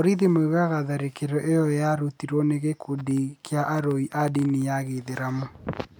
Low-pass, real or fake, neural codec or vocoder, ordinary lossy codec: none; real; none; none